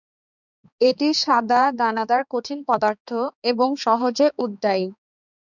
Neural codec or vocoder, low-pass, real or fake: codec, 16 kHz, 4 kbps, X-Codec, HuBERT features, trained on balanced general audio; 7.2 kHz; fake